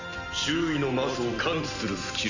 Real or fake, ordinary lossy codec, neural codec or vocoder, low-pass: real; Opus, 64 kbps; none; 7.2 kHz